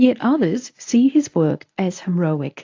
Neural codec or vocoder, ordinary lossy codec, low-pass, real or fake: codec, 24 kHz, 0.9 kbps, WavTokenizer, medium speech release version 1; AAC, 48 kbps; 7.2 kHz; fake